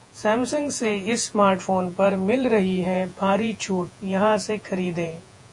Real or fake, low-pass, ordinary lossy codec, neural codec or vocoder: fake; 10.8 kHz; AAC, 64 kbps; vocoder, 48 kHz, 128 mel bands, Vocos